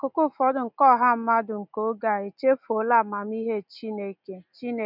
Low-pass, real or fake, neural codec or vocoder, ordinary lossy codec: 5.4 kHz; real; none; none